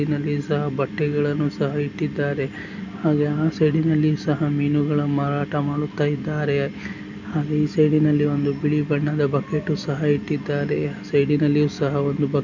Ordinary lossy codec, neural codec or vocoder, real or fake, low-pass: none; none; real; 7.2 kHz